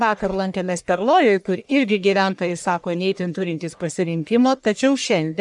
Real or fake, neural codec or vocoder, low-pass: fake; codec, 44.1 kHz, 1.7 kbps, Pupu-Codec; 10.8 kHz